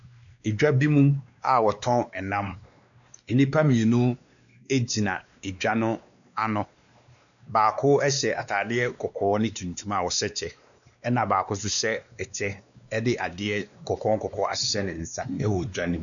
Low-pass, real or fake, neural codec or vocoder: 7.2 kHz; fake; codec, 16 kHz, 2 kbps, X-Codec, WavLM features, trained on Multilingual LibriSpeech